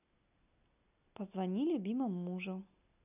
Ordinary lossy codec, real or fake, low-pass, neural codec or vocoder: none; real; 3.6 kHz; none